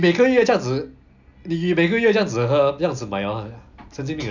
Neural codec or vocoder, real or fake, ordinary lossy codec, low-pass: none; real; none; 7.2 kHz